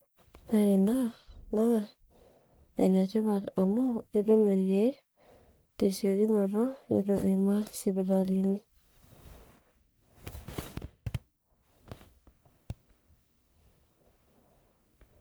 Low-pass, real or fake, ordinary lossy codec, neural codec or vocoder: none; fake; none; codec, 44.1 kHz, 1.7 kbps, Pupu-Codec